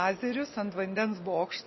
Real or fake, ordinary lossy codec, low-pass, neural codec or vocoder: real; MP3, 24 kbps; 7.2 kHz; none